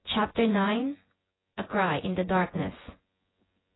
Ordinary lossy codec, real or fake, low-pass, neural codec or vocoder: AAC, 16 kbps; fake; 7.2 kHz; vocoder, 24 kHz, 100 mel bands, Vocos